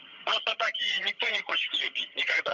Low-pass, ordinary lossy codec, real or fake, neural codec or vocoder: 7.2 kHz; none; fake; vocoder, 22.05 kHz, 80 mel bands, HiFi-GAN